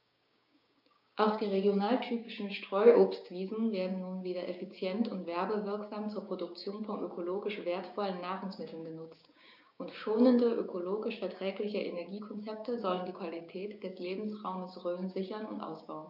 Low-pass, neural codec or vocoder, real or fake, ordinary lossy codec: 5.4 kHz; codec, 16 kHz, 6 kbps, DAC; fake; none